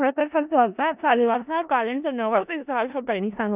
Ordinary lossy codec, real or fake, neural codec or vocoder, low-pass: none; fake; codec, 16 kHz in and 24 kHz out, 0.4 kbps, LongCat-Audio-Codec, four codebook decoder; 3.6 kHz